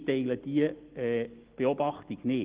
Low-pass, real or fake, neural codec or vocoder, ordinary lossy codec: 3.6 kHz; real; none; Opus, 16 kbps